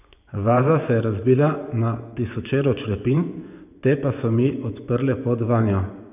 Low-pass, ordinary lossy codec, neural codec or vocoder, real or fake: 3.6 kHz; none; vocoder, 24 kHz, 100 mel bands, Vocos; fake